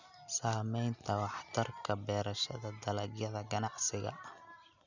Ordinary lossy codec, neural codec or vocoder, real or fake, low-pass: none; none; real; 7.2 kHz